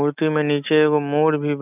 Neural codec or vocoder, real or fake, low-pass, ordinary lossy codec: none; real; 3.6 kHz; none